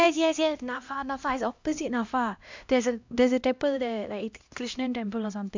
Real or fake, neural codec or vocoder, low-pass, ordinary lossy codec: fake; codec, 16 kHz, 1 kbps, X-Codec, HuBERT features, trained on LibriSpeech; 7.2 kHz; MP3, 64 kbps